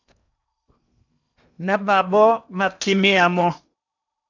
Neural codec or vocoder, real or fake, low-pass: codec, 16 kHz in and 24 kHz out, 0.8 kbps, FocalCodec, streaming, 65536 codes; fake; 7.2 kHz